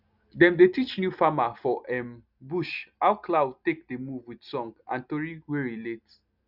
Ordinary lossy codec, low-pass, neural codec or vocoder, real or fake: none; 5.4 kHz; none; real